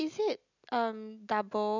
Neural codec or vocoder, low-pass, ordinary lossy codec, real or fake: none; 7.2 kHz; none; real